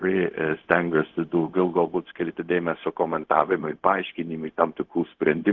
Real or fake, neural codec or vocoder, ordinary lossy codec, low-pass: fake; codec, 16 kHz, 0.4 kbps, LongCat-Audio-Codec; Opus, 16 kbps; 7.2 kHz